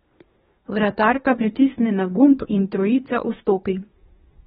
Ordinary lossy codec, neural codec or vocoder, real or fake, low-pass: AAC, 16 kbps; codec, 24 kHz, 1 kbps, SNAC; fake; 10.8 kHz